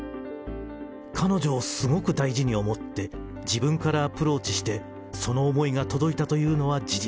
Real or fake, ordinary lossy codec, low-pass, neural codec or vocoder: real; none; none; none